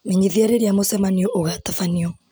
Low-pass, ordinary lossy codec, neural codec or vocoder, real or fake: none; none; none; real